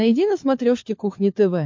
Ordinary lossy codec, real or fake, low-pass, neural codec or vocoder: MP3, 48 kbps; fake; 7.2 kHz; codec, 16 kHz, 16 kbps, FunCodec, trained on LibriTTS, 50 frames a second